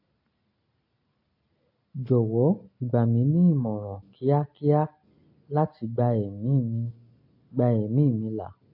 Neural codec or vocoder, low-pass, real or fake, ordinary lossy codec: none; 5.4 kHz; real; AAC, 48 kbps